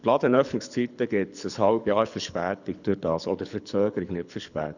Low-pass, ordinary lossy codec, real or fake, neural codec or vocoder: 7.2 kHz; none; fake; codec, 24 kHz, 6 kbps, HILCodec